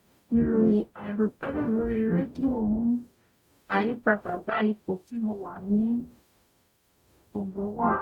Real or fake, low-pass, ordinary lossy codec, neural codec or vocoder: fake; 19.8 kHz; none; codec, 44.1 kHz, 0.9 kbps, DAC